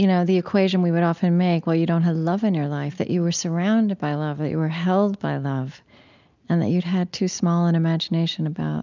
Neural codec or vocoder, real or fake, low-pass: none; real; 7.2 kHz